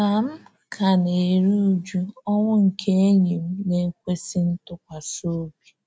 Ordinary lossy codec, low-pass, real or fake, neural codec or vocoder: none; none; real; none